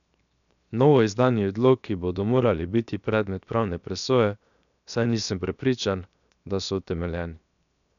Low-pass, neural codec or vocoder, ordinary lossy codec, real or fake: 7.2 kHz; codec, 16 kHz, 0.7 kbps, FocalCodec; none; fake